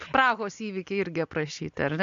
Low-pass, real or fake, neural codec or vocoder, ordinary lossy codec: 7.2 kHz; real; none; MP3, 64 kbps